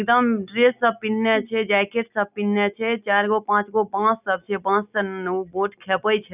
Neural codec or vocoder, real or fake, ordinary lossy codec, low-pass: none; real; none; 3.6 kHz